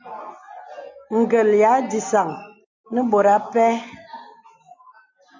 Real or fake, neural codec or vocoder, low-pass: real; none; 7.2 kHz